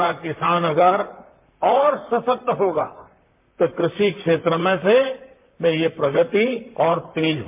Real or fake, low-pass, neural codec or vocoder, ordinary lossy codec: fake; 3.6 kHz; vocoder, 44.1 kHz, 128 mel bands, Pupu-Vocoder; MP3, 32 kbps